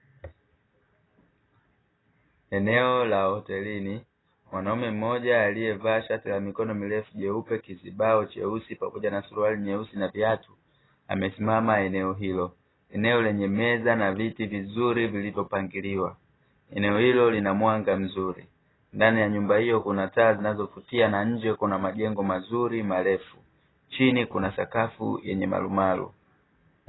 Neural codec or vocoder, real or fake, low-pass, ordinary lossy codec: none; real; 7.2 kHz; AAC, 16 kbps